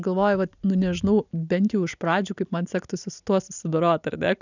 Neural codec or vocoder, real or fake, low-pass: none; real; 7.2 kHz